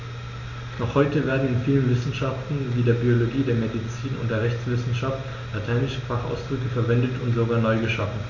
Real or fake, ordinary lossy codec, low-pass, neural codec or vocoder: real; none; 7.2 kHz; none